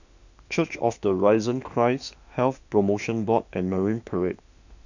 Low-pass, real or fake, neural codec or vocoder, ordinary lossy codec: 7.2 kHz; fake; autoencoder, 48 kHz, 32 numbers a frame, DAC-VAE, trained on Japanese speech; none